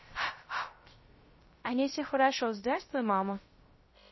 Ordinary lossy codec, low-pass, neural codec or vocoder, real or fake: MP3, 24 kbps; 7.2 kHz; codec, 16 kHz, 0.3 kbps, FocalCodec; fake